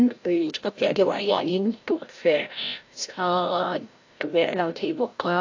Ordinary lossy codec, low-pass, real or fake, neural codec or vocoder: AAC, 48 kbps; 7.2 kHz; fake; codec, 16 kHz, 0.5 kbps, FreqCodec, larger model